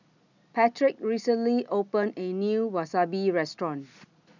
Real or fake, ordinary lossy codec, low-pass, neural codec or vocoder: real; none; 7.2 kHz; none